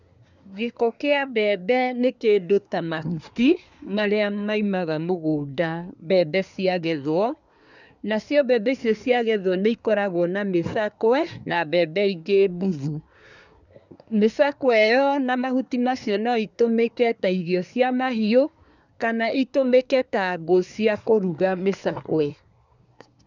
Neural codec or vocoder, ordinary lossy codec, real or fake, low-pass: codec, 24 kHz, 1 kbps, SNAC; none; fake; 7.2 kHz